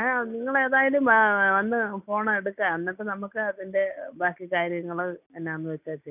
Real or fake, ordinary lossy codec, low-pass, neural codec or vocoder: real; none; 3.6 kHz; none